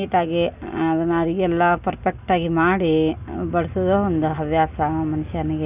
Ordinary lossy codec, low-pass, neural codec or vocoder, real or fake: none; 3.6 kHz; none; real